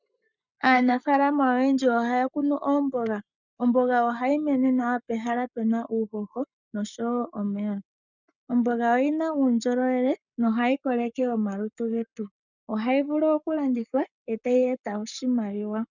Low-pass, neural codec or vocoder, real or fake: 7.2 kHz; codec, 44.1 kHz, 7.8 kbps, Pupu-Codec; fake